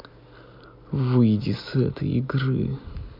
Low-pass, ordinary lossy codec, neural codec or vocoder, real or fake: 5.4 kHz; MP3, 48 kbps; none; real